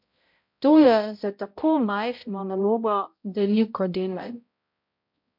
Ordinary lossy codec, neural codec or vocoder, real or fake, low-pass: MP3, 48 kbps; codec, 16 kHz, 0.5 kbps, X-Codec, HuBERT features, trained on balanced general audio; fake; 5.4 kHz